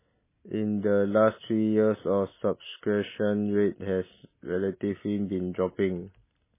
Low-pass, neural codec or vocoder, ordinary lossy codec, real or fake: 3.6 kHz; none; MP3, 16 kbps; real